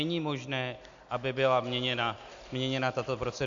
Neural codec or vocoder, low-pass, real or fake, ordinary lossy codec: none; 7.2 kHz; real; AAC, 64 kbps